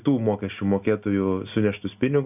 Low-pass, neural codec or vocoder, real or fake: 3.6 kHz; none; real